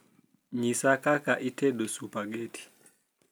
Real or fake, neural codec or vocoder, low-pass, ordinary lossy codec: real; none; none; none